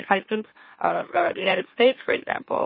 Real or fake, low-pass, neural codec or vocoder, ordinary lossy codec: fake; 5.4 kHz; autoencoder, 44.1 kHz, a latent of 192 numbers a frame, MeloTTS; MP3, 24 kbps